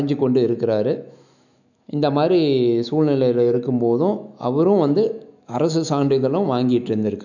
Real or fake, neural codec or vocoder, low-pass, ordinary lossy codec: fake; autoencoder, 48 kHz, 128 numbers a frame, DAC-VAE, trained on Japanese speech; 7.2 kHz; none